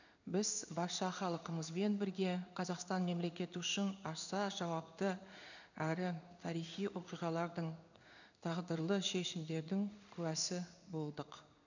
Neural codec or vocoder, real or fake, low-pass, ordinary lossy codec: codec, 16 kHz in and 24 kHz out, 1 kbps, XY-Tokenizer; fake; 7.2 kHz; none